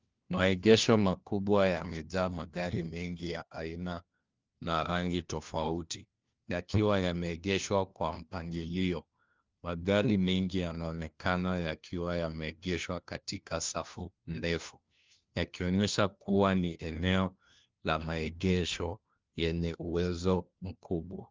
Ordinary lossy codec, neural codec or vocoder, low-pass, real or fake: Opus, 32 kbps; codec, 16 kHz, 1 kbps, FunCodec, trained on LibriTTS, 50 frames a second; 7.2 kHz; fake